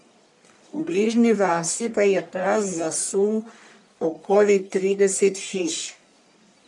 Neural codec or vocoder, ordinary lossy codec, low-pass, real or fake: codec, 44.1 kHz, 1.7 kbps, Pupu-Codec; none; 10.8 kHz; fake